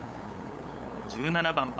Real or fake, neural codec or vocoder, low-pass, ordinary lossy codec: fake; codec, 16 kHz, 8 kbps, FunCodec, trained on LibriTTS, 25 frames a second; none; none